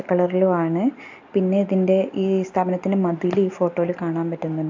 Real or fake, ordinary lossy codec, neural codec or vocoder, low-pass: real; AAC, 48 kbps; none; 7.2 kHz